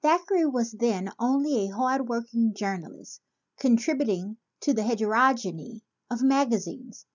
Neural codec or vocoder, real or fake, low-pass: none; real; 7.2 kHz